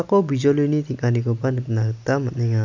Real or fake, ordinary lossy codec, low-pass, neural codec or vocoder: real; none; 7.2 kHz; none